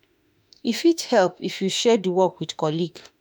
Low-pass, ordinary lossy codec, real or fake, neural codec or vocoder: none; none; fake; autoencoder, 48 kHz, 32 numbers a frame, DAC-VAE, trained on Japanese speech